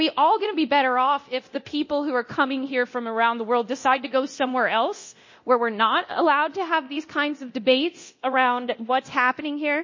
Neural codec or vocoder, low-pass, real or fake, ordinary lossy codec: codec, 24 kHz, 0.9 kbps, DualCodec; 7.2 kHz; fake; MP3, 32 kbps